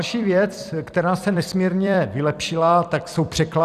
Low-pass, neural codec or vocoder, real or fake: 14.4 kHz; vocoder, 44.1 kHz, 128 mel bands every 256 samples, BigVGAN v2; fake